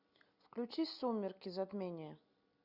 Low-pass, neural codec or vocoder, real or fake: 5.4 kHz; none; real